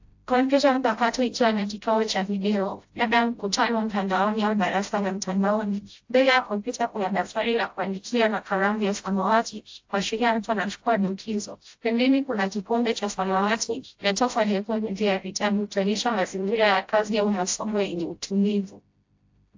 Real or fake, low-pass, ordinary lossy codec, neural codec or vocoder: fake; 7.2 kHz; AAC, 48 kbps; codec, 16 kHz, 0.5 kbps, FreqCodec, smaller model